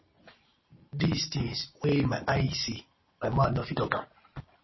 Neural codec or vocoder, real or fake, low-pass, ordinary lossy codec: none; real; 7.2 kHz; MP3, 24 kbps